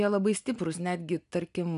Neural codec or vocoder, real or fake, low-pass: none; real; 10.8 kHz